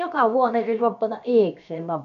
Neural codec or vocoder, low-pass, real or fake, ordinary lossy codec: codec, 16 kHz, about 1 kbps, DyCAST, with the encoder's durations; 7.2 kHz; fake; none